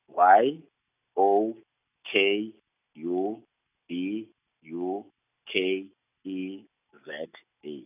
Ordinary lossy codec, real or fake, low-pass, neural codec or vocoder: none; real; 3.6 kHz; none